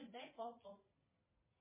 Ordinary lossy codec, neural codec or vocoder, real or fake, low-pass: MP3, 16 kbps; vocoder, 22.05 kHz, 80 mel bands, Vocos; fake; 3.6 kHz